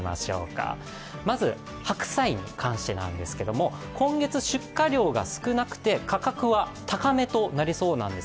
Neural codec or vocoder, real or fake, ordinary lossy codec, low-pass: none; real; none; none